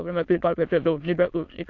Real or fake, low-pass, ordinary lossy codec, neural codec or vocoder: fake; 7.2 kHz; AAC, 48 kbps; autoencoder, 22.05 kHz, a latent of 192 numbers a frame, VITS, trained on many speakers